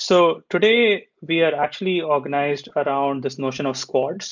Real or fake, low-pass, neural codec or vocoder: real; 7.2 kHz; none